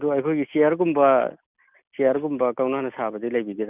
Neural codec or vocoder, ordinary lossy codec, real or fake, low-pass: none; none; real; 3.6 kHz